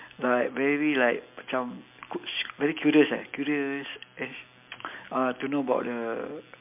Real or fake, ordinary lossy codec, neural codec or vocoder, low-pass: real; MP3, 32 kbps; none; 3.6 kHz